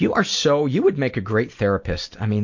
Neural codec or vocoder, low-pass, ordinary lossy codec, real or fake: none; 7.2 kHz; MP3, 48 kbps; real